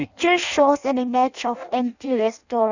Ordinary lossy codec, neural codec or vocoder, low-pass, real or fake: none; codec, 16 kHz in and 24 kHz out, 0.6 kbps, FireRedTTS-2 codec; 7.2 kHz; fake